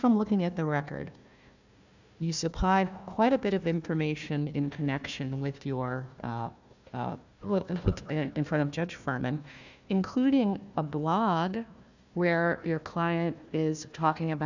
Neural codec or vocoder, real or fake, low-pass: codec, 16 kHz, 1 kbps, FunCodec, trained on Chinese and English, 50 frames a second; fake; 7.2 kHz